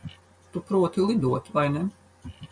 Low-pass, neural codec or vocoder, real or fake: 9.9 kHz; none; real